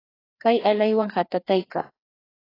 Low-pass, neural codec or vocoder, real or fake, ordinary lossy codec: 5.4 kHz; codec, 16 kHz, 2 kbps, FreqCodec, larger model; fake; AAC, 24 kbps